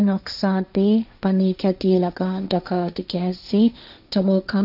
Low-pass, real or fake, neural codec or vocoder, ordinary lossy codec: 5.4 kHz; fake; codec, 16 kHz, 1.1 kbps, Voila-Tokenizer; none